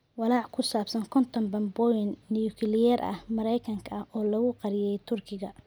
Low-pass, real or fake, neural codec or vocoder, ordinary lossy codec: none; real; none; none